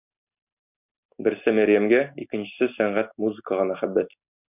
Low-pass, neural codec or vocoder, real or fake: 3.6 kHz; none; real